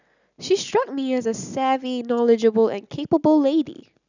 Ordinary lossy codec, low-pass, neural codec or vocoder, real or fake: none; 7.2 kHz; none; real